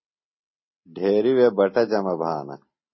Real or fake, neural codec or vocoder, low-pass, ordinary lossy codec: real; none; 7.2 kHz; MP3, 24 kbps